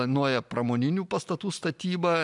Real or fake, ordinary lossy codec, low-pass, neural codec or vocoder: real; MP3, 96 kbps; 10.8 kHz; none